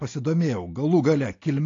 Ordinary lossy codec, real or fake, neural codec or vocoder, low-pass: AAC, 32 kbps; real; none; 7.2 kHz